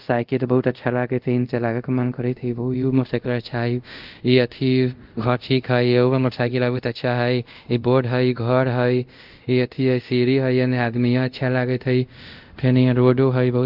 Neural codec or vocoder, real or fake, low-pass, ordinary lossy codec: codec, 24 kHz, 0.5 kbps, DualCodec; fake; 5.4 kHz; Opus, 32 kbps